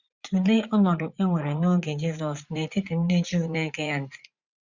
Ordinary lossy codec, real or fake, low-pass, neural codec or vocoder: Opus, 64 kbps; fake; 7.2 kHz; vocoder, 44.1 kHz, 80 mel bands, Vocos